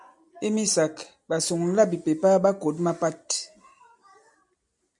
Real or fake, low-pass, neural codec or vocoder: real; 10.8 kHz; none